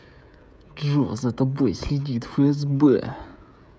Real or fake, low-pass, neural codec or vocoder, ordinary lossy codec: fake; none; codec, 16 kHz, 16 kbps, FreqCodec, smaller model; none